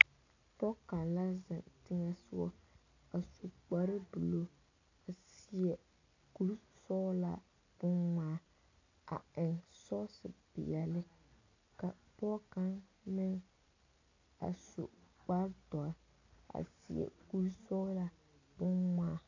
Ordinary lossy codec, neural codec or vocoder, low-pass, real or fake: AAC, 48 kbps; none; 7.2 kHz; real